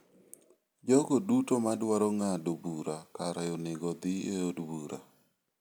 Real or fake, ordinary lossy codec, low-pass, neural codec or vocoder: real; none; none; none